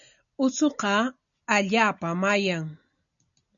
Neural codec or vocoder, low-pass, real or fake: none; 7.2 kHz; real